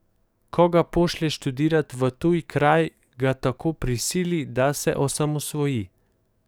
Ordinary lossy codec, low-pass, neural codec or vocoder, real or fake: none; none; codec, 44.1 kHz, 7.8 kbps, DAC; fake